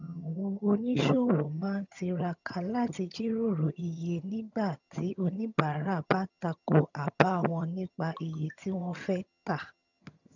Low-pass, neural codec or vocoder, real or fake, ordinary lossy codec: 7.2 kHz; vocoder, 22.05 kHz, 80 mel bands, HiFi-GAN; fake; none